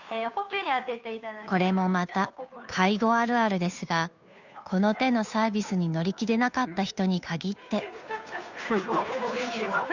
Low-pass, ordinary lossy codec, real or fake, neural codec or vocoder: 7.2 kHz; none; fake; codec, 16 kHz, 2 kbps, FunCodec, trained on Chinese and English, 25 frames a second